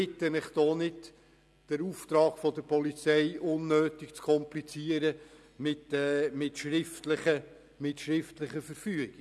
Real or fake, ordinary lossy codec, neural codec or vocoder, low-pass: real; none; none; none